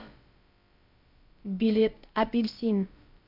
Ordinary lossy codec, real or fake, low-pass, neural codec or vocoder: MP3, 48 kbps; fake; 5.4 kHz; codec, 16 kHz, about 1 kbps, DyCAST, with the encoder's durations